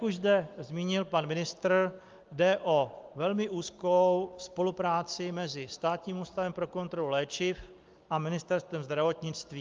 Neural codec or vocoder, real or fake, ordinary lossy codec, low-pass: none; real; Opus, 32 kbps; 7.2 kHz